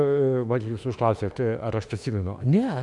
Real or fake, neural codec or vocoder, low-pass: fake; autoencoder, 48 kHz, 32 numbers a frame, DAC-VAE, trained on Japanese speech; 10.8 kHz